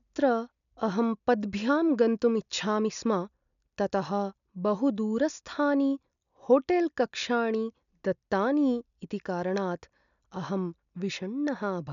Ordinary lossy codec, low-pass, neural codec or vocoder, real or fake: MP3, 96 kbps; 7.2 kHz; none; real